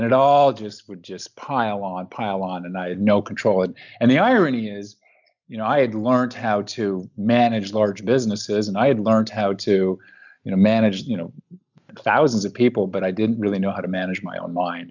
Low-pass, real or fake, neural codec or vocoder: 7.2 kHz; real; none